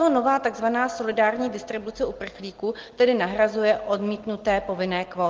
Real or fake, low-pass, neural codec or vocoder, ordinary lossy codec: real; 7.2 kHz; none; Opus, 16 kbps